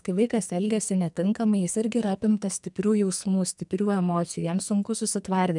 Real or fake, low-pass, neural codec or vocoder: fake; 10.8 kHz; codec, 44.1 kHz, 2.6 kbps, SNAC